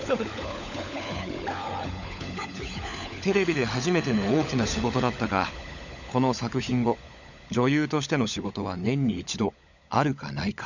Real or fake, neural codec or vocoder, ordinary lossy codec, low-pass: fake; codec, 16 kHz, 16 kbps, FunCodec, trained on LibriTTS, 50 frames a second; none; 7.2 kHz